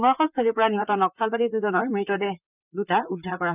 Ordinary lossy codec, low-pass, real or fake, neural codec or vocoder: none; 3.6 kHz; fake; vocoder, 44.1 kHz, 128 mel bands, Pupu-Vocoder